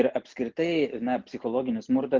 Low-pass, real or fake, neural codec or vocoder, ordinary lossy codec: 7.2 kHz; real; none; Opus, 32 kbps